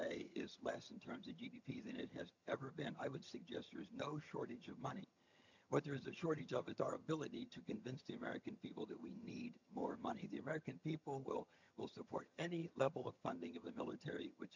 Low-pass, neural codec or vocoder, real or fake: 7.2 kHz; vocoder, 22.05 kHz, 80 mel bands, HiFi-GAN; fake